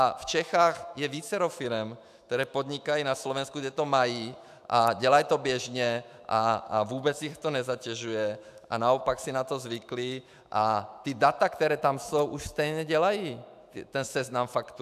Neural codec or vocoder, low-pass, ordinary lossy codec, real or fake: autoencoder, 48 kHz, 128 numbers a frame, DAC-VAE, trained on Japanese speech; 14.4 kHz; AAC, 96 kbps; fake